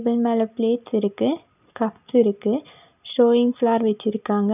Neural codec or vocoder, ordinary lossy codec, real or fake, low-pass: none; none; real; 3.6 kHz